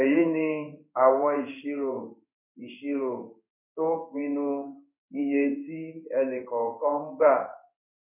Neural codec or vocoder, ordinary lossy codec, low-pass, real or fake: codec, 16 kHz in and 24 kHz out, 1 kbps, XY-Tokenizer; none; 3.6 kHz; fake